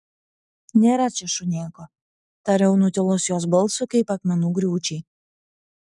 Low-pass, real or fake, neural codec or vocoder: 10.8 kHz; real; none